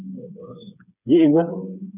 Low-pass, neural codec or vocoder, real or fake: 3.6 kHz; codec, 16 kHz, 4 kbps, FreqCodec, smaller model; fake